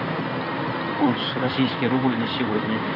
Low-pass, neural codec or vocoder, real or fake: 5.4 kHz; codec, 16 kHz in and 24 kHz out, 2.2 kbps, FireRedTTS-2 codec; fake